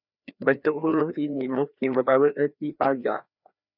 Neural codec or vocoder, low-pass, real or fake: codec, 16 kHz, 2 kbps, FreqCodec, larger model; 5.4 kHz; fake